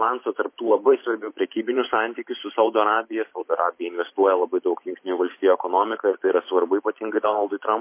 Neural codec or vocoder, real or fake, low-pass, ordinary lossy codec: vocoder, 44.1 kHz, 128 mel bands every 512 samples, BigVGAN v2; fake; 3.6 kHz; MP3, 24 kbps